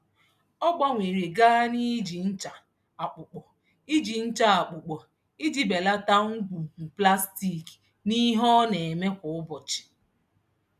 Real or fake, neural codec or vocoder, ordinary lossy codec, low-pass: real; none; none; 14.4 kHz